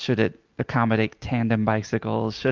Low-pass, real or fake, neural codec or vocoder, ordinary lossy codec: 7.2 kHz; real; none; Opus, 24 kbps